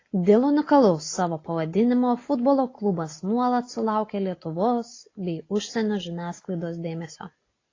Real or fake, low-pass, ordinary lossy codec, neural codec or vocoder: real; 7.2 kHz; AAC, 32 kbps; none